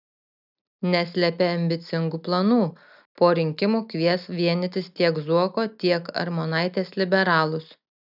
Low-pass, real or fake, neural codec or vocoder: 5.4 kHz; real; none